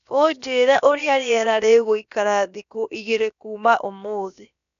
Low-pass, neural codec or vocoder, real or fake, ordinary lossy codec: 7.2 kHz; codec, 16 kHz, about 1 kbps, DyCAST, with the encoder's durations; fake; none